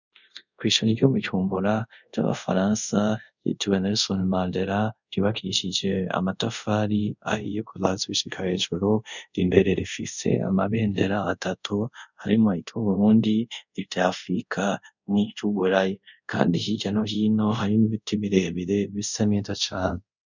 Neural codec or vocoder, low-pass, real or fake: codec, 24 kHz, 0.5 kbps, DualCodec; 7.2 kHz; fake